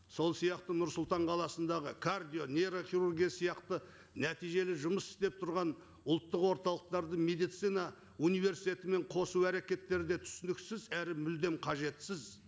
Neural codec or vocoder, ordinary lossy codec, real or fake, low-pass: none; none; real; none